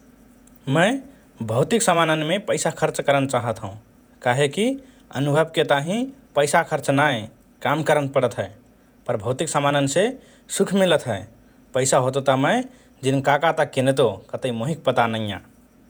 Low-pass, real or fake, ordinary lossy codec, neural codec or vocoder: none; fake; none; vocoder, 48 kHz, 128 mel bands, Vocos